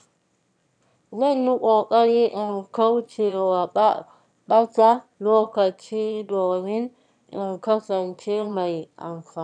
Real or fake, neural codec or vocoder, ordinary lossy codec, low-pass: fake; autoencoder, 22.05 kHz, a latent of 192 numbers a frame, VITS, trained on one speaker; none; 9.9 kHz